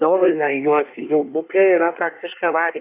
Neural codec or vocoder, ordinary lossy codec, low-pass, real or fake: codec, 24 kHz, 1 kbps, SNAC; AAC, 24 kbps; 3.6 kHz; fake